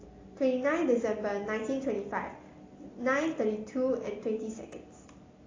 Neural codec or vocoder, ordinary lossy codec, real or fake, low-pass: none; AAC, 32 kbps; real; 7.2 kHz